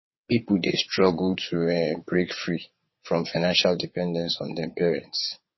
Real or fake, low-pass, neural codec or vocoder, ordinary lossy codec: fake; 7.2 kHz; vocoder, 22.05 kHz, 80 mel bands, WaveNeXt; MP3, 24 kbps